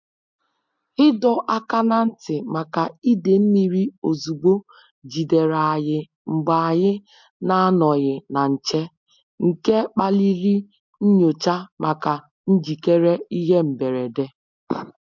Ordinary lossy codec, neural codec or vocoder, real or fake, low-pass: MP3, 64 kbps; none; real; 7.2 kHz